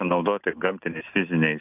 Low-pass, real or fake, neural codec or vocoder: 3.6 kHz; real; none